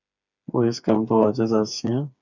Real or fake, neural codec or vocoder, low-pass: fake; codec, 16 kHz, 4 kbps, FreqCodec, smaller model; 7.2 kHz